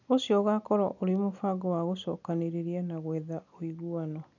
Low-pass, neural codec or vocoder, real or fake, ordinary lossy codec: 7.2 kHz; none; real; none